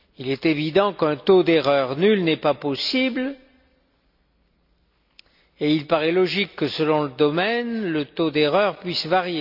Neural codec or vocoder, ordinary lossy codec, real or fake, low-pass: none; none; real; 5.4 kHz